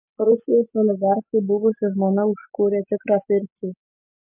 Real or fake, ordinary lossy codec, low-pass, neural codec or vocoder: real; MP3, 32 kbps; 3.6 kHz; none